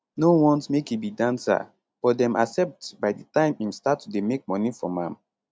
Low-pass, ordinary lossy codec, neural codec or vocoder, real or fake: none; none; none; real